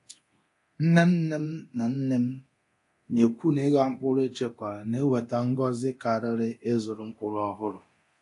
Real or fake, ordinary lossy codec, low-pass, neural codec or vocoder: fake; AAC, 48 kbps; 10.8 kHz; codec, 24 kHz, 0.9 kbps, DualCodec